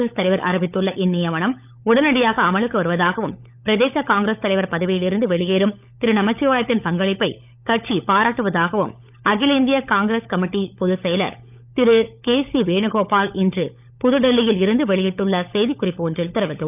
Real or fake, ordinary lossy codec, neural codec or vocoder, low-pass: fake; none; codec, 16 kHz, 16 kbps, FunCodec, trained on LibriTTS, 50 frames a second; 3.6 kHz